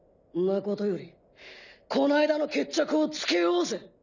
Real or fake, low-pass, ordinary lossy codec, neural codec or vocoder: real; 7.2 kHz; none; none